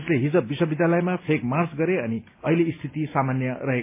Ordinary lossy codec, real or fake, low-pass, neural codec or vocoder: MP3, 24 kbps; real; 3.6 kHz; none